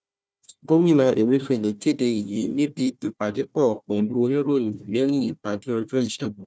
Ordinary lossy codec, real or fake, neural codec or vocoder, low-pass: none; fake; codec, 16 kHz, 1 kbps, FunCodec, trained on Chinese and English, 50 frames a second; none